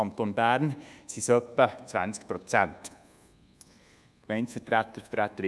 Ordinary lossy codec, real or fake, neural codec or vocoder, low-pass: none; fake; codec, 24 kHz, 1.2 kbps, DualCodec; none